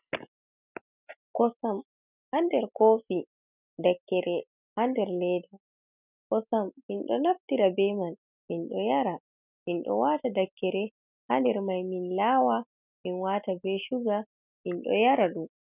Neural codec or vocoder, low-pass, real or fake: none; 3.6 kHz; real